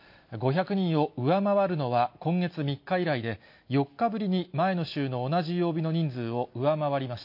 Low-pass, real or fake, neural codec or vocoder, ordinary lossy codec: 5.4 kHz; real; none; MP3, 32 kbps